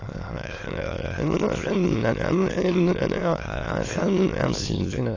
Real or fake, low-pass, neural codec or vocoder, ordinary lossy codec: fake; 7.2 kHz; autoencoder, 22.05 kHz, a latent of 192 numbers a frame, VITS, trained on many speakers; AAC, 32 kbps